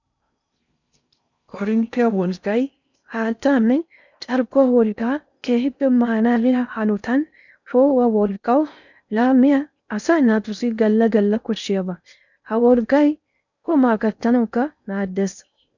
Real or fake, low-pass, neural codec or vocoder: fake; 7.2 kHz; codec, 16 kHz in and 24 kHz out, 0.6 kbps, FocalCodec, streaming, 4096 codes